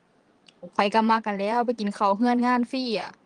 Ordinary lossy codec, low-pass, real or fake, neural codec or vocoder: Opus, 24 kbps; 9.9 kHz; fake; vocoder, 22.05 kHz, 80 mel bands, Vocos